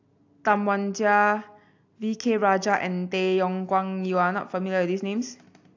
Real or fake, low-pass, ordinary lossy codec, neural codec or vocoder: real; 7.2 kHz; AAC, 48 kbps; none